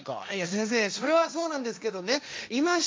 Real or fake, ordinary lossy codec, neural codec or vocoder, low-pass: fake; none; codec, 16 kHz, 1.1 kbps, Voila-Tokenizer; none